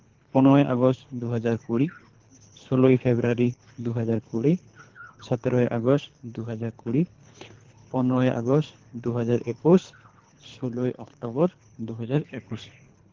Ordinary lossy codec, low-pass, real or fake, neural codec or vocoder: Opus, 16 kbps; 7.2 kHz; fake; codec, 24 kHz, 3 kbps, HILCodec